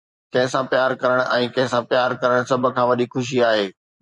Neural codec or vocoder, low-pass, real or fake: vocoder, 24 kHz, 100 mel bands, Vocos; 10.8 kHz; fake